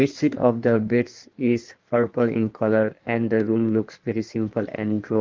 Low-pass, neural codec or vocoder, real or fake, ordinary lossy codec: 7.2 kHz; codec, 16 kHz in and 24 kHz out, 1.1 kbps, FireRedTTS-2 codec; fake; Opus, 32 kbps